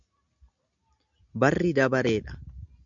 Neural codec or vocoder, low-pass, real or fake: none; 7.2 kHz; real